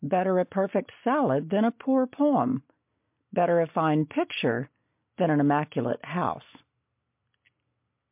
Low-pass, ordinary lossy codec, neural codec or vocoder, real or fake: 3.6 kHz; MP3, 32 kbps; none; real